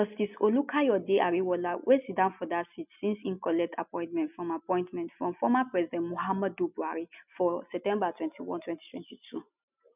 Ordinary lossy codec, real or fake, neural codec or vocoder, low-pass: none; real; none; 3.6 kHz